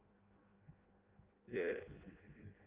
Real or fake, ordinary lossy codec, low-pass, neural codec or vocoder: fake; Opus, 32 kbps; 3.6 kHz; codec, 16 kHz in and 24 kHz out, 0.6 kbps, FireRedTTS-2 codec